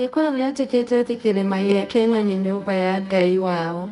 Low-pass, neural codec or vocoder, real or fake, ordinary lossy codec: 10.8 kHz; codec, 24 kHz, 0.9 kbps, WavTokenizer, medium music audio release; fake; none